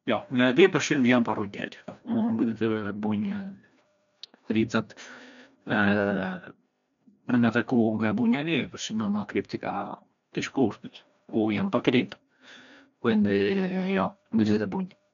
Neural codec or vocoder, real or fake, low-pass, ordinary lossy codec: codec, 16 kHz, 1 kbps, FreqCodec, larger model; fake; 7.2 kHz; MP3, 64 kbps